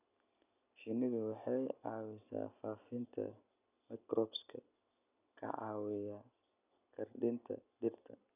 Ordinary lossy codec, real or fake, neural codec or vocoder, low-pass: none; real; none; 3.6 kHz